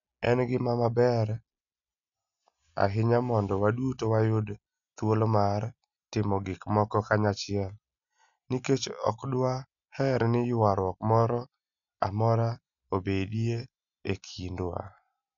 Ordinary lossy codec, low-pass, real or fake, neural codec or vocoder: none; 7.2 kHz; real; none